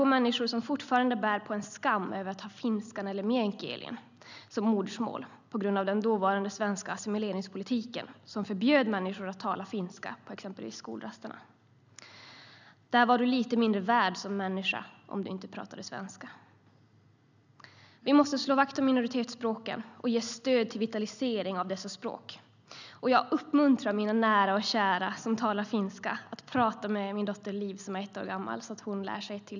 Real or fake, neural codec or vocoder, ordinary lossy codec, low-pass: real; none; none; 7.2 kHz